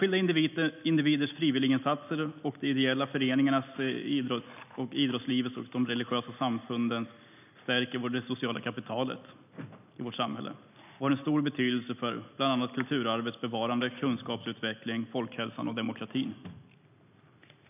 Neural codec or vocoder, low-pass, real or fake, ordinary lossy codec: none; 3.6 kHz; real; none